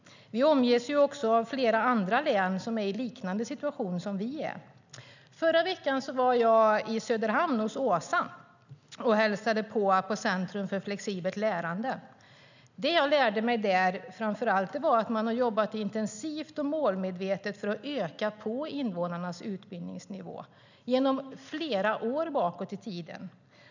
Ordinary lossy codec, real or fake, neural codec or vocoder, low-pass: none; real; none; 7.2 kHz